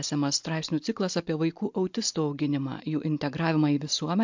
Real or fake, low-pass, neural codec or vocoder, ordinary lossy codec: real; 7.2 kHz; none; MP3, 64 kbps